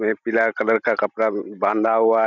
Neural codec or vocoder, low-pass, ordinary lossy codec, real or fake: none; 7.2 kHz; none; real